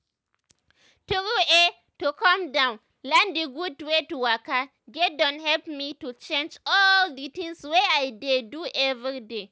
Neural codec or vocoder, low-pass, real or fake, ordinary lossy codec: none; none; real; none